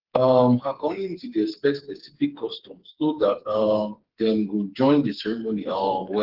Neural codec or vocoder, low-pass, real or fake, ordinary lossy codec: codec, 16 kHz, 4 kbps, FreqCodec, smaller model; 5.4 kHz; fake; Opus, 16 kbps